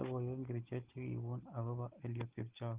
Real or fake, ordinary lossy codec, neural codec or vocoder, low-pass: real; Opus, 16 kbps; none; 3.6 kHz